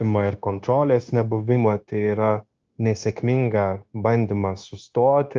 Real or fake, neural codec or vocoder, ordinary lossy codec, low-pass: fake; codec, 16 kHz, 0.9 kbps, LongCat-Audio-Codec; Opus, 24 kbps; 7.2 kHz